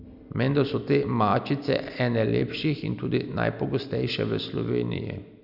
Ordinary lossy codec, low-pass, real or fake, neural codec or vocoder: none; 5.4 kHz; real; none